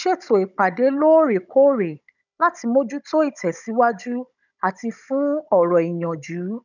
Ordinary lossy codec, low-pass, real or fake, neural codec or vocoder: none; 7.2 kHz; fake; codec, 16 kHz, 16 kbps, FunCodec, trained on Chinese and English, 50 frames a second